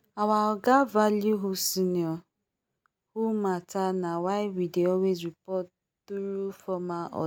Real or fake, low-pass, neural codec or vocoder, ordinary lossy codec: real; 19.8 kHz; none; none